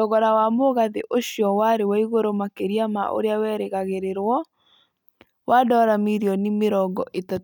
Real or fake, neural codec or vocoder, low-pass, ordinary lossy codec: real; none; none; none